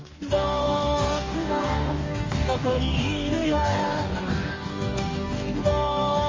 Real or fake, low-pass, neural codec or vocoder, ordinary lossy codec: fake; 7.2 kHz; codec, 44.1 kHz, 2.6 kbps, DAC; MP3, 32 kbps